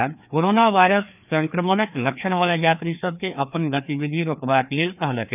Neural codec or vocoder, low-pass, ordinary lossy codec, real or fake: codec, 16 kHz, 2 kbps, FreqCodec, larger model; 3.6 kHz; none; fake